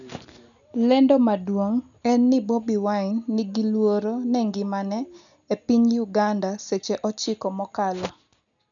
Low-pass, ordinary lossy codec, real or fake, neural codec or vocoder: 7.2 kHz; none; real; none